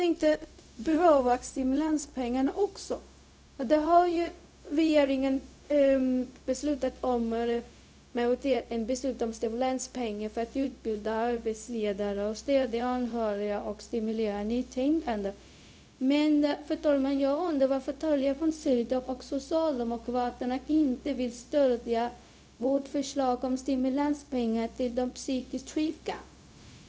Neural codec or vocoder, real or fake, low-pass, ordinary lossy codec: codec, 16 kHz, 0.4 kbps, LongCat-Audio-Codec; fake; none; none